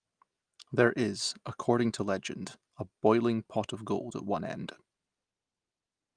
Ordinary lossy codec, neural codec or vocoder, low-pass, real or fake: Opus, 32 kbps; none; 9.9 kHz; real